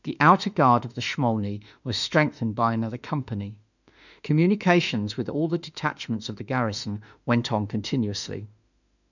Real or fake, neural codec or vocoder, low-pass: fake; autoencoder, 48 kHz, 32 numbers a frame, DAC-VAE, trained on Japanese speech; 7.2 kHz